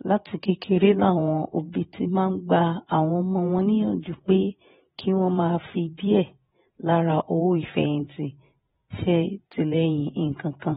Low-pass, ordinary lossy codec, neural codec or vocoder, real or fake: 19.8 kHz; AAC, 16 kbps; vocoder, 48 kHz, 128 mel bands, Vocos; fake